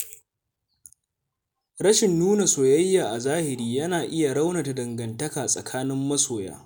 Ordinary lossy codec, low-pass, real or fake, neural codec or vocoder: none; none; real; none